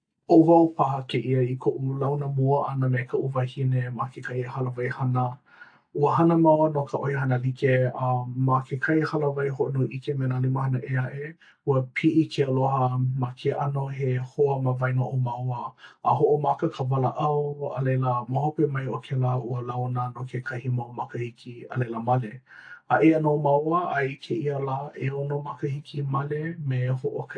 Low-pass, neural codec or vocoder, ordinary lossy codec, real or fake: 9.9 kHz; none; AAC, 48 kbps; real